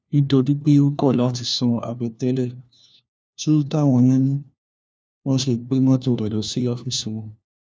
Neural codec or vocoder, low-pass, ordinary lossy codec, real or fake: codec, 16 kHz, 1 kbps, FunCodec, trained on LibriTTS, 50 frames a second; none; none; fake